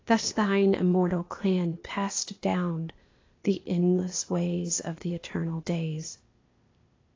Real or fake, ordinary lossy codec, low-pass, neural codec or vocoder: fake; AAC, 32 kbps; 7.2 kHz; codec, 16 kHz, 0.8 kbps, ZipCodec